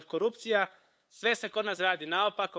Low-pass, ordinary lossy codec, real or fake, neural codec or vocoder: none; none; fake; codec, 16 kHz, 8 kbps, FunCodec, trained on LibriTTS, 25 frames a second